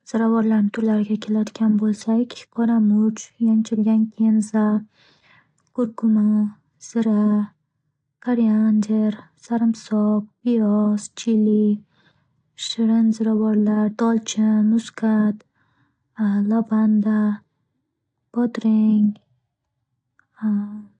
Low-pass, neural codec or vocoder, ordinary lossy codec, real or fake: 9.9 kHz; none; AAC, 48 kbps; real